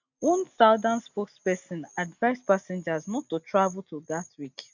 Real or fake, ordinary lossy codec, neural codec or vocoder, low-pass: real; none; none; 7.2 kHz